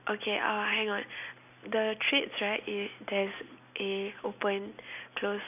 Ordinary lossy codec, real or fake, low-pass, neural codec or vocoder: none; real; 3.6 kHz; none